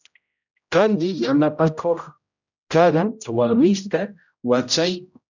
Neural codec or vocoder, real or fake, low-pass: codec, 16 kHz, 0.5 kbps, X-Codec, HuBERT features, trained on general audio; fake; 7.2 kHz